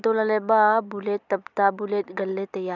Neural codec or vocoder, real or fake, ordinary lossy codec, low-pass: none; real; none; 7.2 kHz